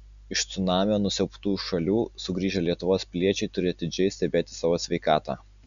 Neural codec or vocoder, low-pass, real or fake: none; 7.2 kHz; real